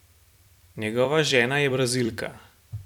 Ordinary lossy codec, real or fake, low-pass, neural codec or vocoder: none; fake; 19.8 kHz; vocoder, 44.1 kHz, 128 mel bands every 256 samples, BigVGAN v2